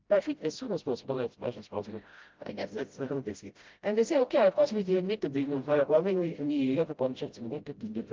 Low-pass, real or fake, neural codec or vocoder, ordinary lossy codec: 7.2 kHz; fake; codec, 16 kHz, 0.5 kbps, FreqCodec, smaller model; Opus, 32 kbps